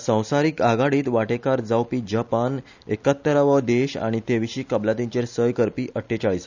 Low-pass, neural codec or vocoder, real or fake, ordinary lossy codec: 7.2 kHz; none; real; none